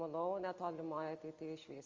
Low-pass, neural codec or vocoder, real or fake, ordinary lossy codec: 7.2 kHz; none; real; Opus, 32 kbps